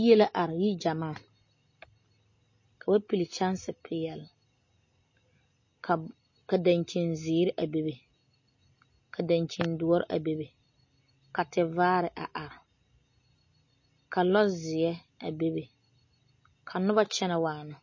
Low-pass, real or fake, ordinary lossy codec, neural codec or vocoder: 7.2 kHz; real; MP3, 32 kbps; none